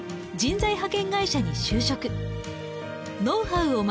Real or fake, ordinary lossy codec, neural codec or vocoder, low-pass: real; none; none; none